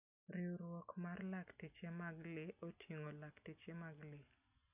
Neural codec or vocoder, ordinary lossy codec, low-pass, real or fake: none; none; 3.6 kHz; real